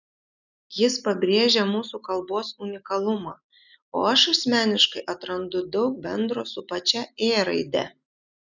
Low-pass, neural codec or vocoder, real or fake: 7.2 kHz; none; real